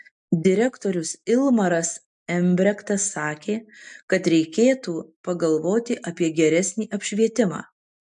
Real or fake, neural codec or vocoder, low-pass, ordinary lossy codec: real; none; 9.9 kHz; MP3, 64 kbps